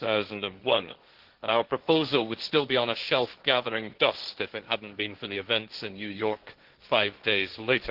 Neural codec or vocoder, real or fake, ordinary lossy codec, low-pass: codec, 16 kHz, 1.1 kbps, Voila-Tokenizer; fake; Opus, 16 kbps; 5.4 kHz